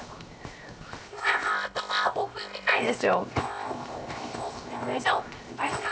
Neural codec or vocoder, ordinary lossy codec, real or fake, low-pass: codec, 16 kHz, 0.7 kbps, FocalCodec; none; fake; none